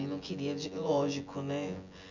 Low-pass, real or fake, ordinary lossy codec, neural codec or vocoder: 7.2 kHz; fake; none; vocoder, 24 kHz, 100 mel bands, Vocos